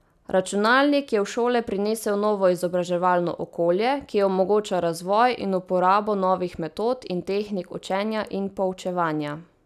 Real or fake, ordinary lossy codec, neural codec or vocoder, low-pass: real; none; none; 14.4 kHz